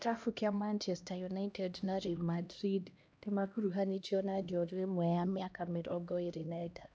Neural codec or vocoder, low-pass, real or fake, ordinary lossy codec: codec, 16 kHz, 1 kbps, X-Codec, HuBERT features, trained on LibriSpeech; none; fake; none